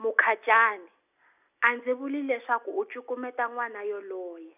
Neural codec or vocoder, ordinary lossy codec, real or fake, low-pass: none; none; real; 3.6 kHz